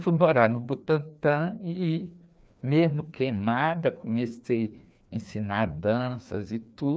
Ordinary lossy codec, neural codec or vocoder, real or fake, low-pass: none; codec, 16 kHz, 2 kbps, FreqCodec, larger model; fake; none